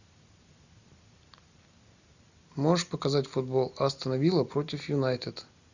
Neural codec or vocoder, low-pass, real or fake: none; 7.2 kHz; real